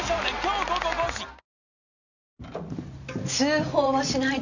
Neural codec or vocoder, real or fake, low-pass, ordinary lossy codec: none; real; 7.2 kHz; none